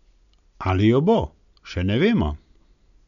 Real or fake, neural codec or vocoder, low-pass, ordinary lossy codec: real; none; 7.2 kHz; none